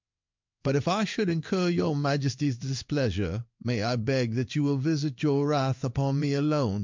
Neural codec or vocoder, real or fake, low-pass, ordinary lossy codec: codec, 16 kHz in and 24 kHz out, 1 kbps, XY-Tokenizer; fake; 7.2 kHz; MP3, 64 kbps